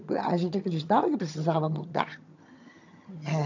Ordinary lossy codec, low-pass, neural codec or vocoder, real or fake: AAC, 48 kbps; 7.2 kHz; vocoder, 22.05 kHz, 80 mel bands, HiFi-GAN; fake